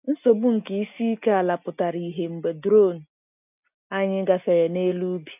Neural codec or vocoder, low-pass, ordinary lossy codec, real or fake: none; 3.6 kHz; none; real